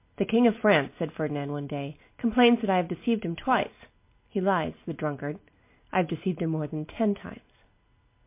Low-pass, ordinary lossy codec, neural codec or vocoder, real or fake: 3.6 kHz; MP3, 24 kbps; none; real